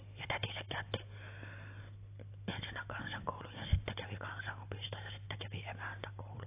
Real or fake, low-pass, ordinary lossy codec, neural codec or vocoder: real; 3.6 kHz; AAC, 24 kbps; none